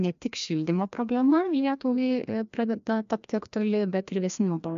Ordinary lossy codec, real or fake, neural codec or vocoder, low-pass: MP3, 64 kbps; fake; codec, 16 kHz, 1 kbps, FreqCodec, larger model; 7.2 kHz